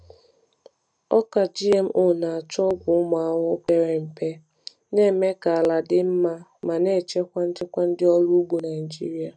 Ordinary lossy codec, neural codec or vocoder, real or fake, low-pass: none; none; real; 9.9 kHz